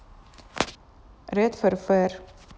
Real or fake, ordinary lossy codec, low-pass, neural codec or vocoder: real; none; none; none